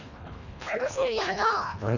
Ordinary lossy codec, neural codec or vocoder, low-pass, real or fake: none; codec, 24 kHz, 1.5 kbps, HILCodec; 7.2 kHz; fake